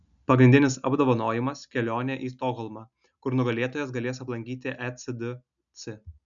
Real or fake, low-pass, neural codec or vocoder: real; 7.2 kHz; none